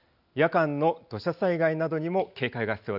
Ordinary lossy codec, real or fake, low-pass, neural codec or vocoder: none; real; 5.4 kHz; none